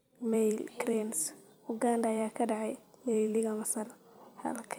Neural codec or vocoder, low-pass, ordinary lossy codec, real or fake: vocoder, 44.1 kHz, 128 mel bands every 256 samples, BigVGAN v2; none; none; fake